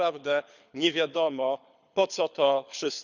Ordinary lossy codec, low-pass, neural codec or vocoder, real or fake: none; 7.2 kHz; codec, 24 kHz, 6 kbps, HILCodec; fake